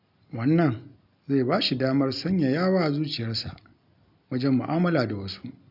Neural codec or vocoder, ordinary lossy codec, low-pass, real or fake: none; none; 5.4 kHz; real